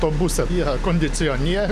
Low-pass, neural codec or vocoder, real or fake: 14.4 kHz; none; real